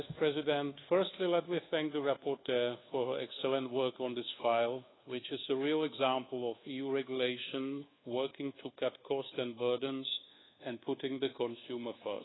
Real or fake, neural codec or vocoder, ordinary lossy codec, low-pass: fake; codec, 24 kHz, 1.2 kbps, DualCodec; AAC, 16 kbps; 7.2 kHz